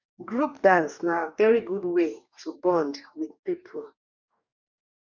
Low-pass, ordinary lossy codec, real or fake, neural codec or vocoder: 7.2 kHz; none; fake; codec, 44.1 kHz, 2.6 kbps, DAC